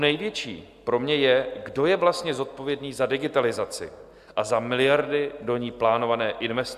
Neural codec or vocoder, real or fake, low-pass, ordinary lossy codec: none; real; 14.4 kHz; Opus, 64 kbps